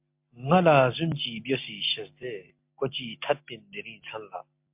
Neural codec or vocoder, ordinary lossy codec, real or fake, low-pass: none; MP3, 32 kbps; real; 3.6 kHz